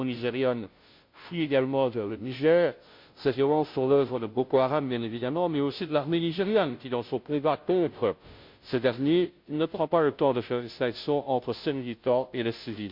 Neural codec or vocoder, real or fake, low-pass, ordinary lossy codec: codec, 16 kHz, 0.5 kbps, FunCodec, trained on Chinese and English, 25 frames a second; fake; 5.4 kHz; MP3, 48 kbps